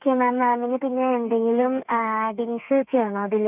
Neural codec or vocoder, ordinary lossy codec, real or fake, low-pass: codec, 32 kHz, 1.9 kbps, SNAC; none; fake; 3.6 kHz